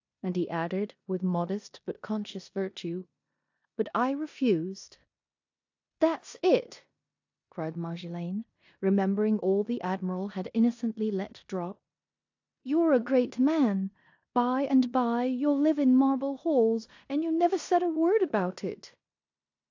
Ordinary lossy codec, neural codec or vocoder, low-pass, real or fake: AAC, 48 kbps; codec, 16 kHz in and 24 kHz out, 0.9 kbps, LongCat-Audio-Codec, four codebook decoder; 7.2 kHz; fake